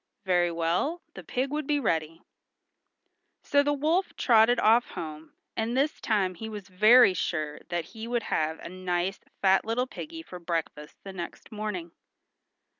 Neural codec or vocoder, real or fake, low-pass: none; real; 7.2 kHz